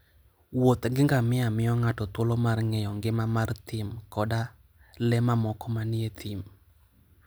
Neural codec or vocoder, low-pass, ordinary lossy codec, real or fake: none; none; none; real